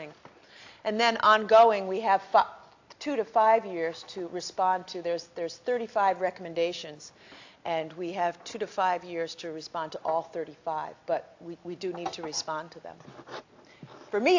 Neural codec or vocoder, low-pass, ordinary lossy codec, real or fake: none; 7.2 kHz; AAC, 48 kbps; real